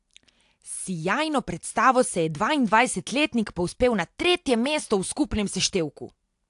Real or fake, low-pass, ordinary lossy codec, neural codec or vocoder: real; 10.8 kHz; AAC, 64 kbps; none